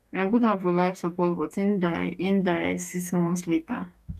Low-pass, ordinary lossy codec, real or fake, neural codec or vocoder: 14.4 kHz; none; fake; codec, 44.1 kHz, 2.6 kbps, DAC